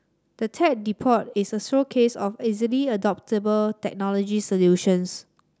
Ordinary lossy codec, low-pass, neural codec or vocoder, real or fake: none; none; none; real